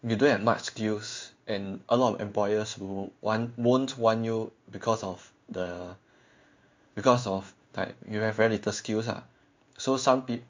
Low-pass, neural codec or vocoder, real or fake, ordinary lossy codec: 7.2 kHz; codec, 16 kHz in and 24 kHz out, 1 kbps, XY-Tokenizer; fake; MP3, 64 kbps